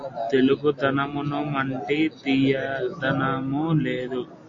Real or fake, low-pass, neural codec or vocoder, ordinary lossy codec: real; 7.2 kHz; none; AAC, 48 kbps